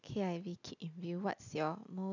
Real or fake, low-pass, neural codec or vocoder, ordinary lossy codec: real; 7.2 kHz; none; none